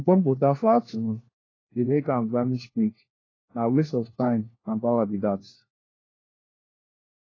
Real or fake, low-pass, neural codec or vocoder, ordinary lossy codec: fake; 7.2 kHz; codec, 16 kHz, 1 kbps, FunCodec, trained on LibriTTS, 50 frames a second; AAC, 32 kbps